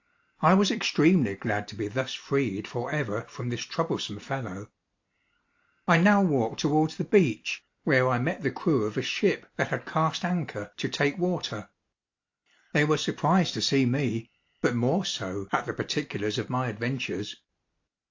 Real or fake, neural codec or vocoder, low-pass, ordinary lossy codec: real; none; 7.2 kHz; AAC, 48 kbps